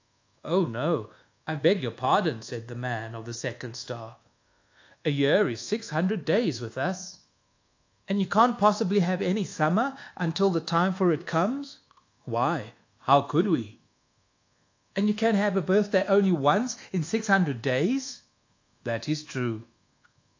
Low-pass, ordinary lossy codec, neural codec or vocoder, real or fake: 7.2 kHz; AAC, 48 kbps; codec, 24 kHz, 1.2 kbps, DualCodec; fake